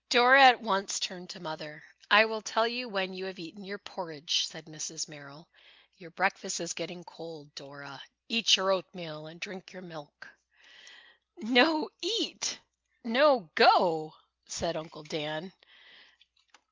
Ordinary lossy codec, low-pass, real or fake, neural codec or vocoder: Opus, 24 kbps; 7.2 kHz; real; none